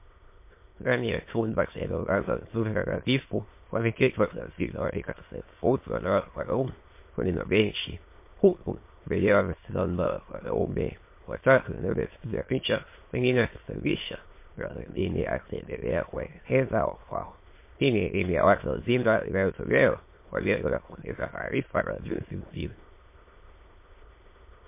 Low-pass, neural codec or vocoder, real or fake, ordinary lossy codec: 3.6 kHz; autoencoder, 22.05 kHz, a latent of 192 numbers a frame, VITS, trained on many speakers; fake; MP3, 24 kbps